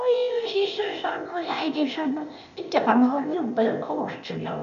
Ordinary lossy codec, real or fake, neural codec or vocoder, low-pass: none; fake; codec, 16 kHz, 0.8 kbps, ZipCodec; 7.2 kHz